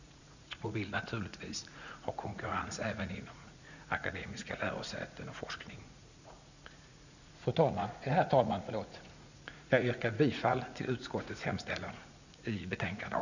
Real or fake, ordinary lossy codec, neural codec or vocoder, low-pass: fake; none; vocoder, 44.1 kHz, 80 mel bands, Vocos; 7.2 kHz